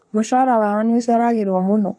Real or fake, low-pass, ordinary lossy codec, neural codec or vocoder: fake; none; none; codec, 24 kHz, 1 kbps, SNAC